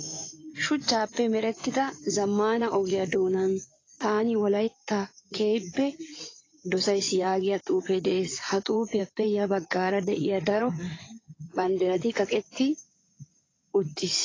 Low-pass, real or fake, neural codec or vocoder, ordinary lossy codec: 7.2 kHz; fake; codec, 16 kHz in and 24 kHz out, 2.2 kbps, FireRedTTS-2 codec; AAC, 32 kbps